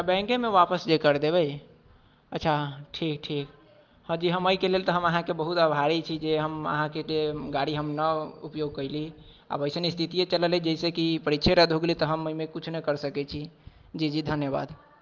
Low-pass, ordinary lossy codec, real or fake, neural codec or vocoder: 7.2 kHz; Opus, 24 kbps; real; none